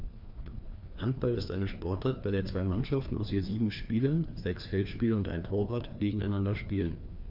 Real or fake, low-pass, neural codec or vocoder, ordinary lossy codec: fake; 5.4 kHz; codec, 16 kHz, 2 kbps, FreqCodec, larger model; none